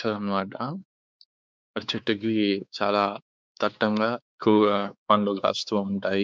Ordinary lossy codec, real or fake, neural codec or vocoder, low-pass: none; fake; codec, 16 kHz, 4 kbps, X-Codec, WavLM features, trained on Multilingual LibriSpeech; none